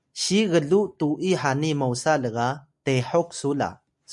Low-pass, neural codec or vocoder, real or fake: 10.8 kHz; none; real